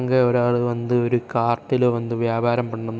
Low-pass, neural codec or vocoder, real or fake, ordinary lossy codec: none; none; real; none